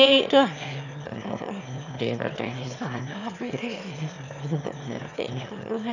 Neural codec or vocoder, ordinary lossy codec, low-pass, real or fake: autoencoder, 22.05 kHz, a latent of 192 numbers a frame, VITS, trained on one speaker; none; 7.2 kHz; fake